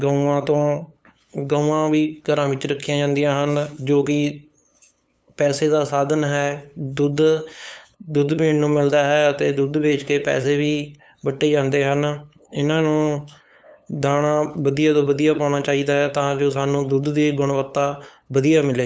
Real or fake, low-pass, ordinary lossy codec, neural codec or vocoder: fake; none; none; codec, 16 kHz, 8 kbps, FunCodec, trained on LibriTTS, 25 frames a second